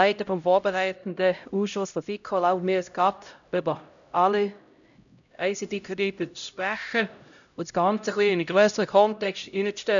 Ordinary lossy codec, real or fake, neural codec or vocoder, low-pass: none; fake; codec, 16 kHz, 0.5 kbps, X-Codec, HuBERT features, trained on LibriSpeech; 7.2 kHz